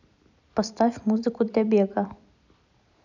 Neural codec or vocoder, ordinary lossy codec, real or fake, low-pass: vocoder, 44.1 kHz, 128 mel bands every 256 samples, BigVGAN v2; none; fake; 7.2 kHz